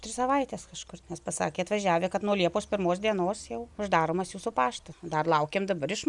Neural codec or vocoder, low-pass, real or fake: none; 10.8 kHz; real